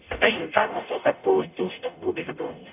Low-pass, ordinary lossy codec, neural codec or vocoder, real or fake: 3.6 kHz; none; codec, 44.1 kHz, 0.9 kbps, DAC; fake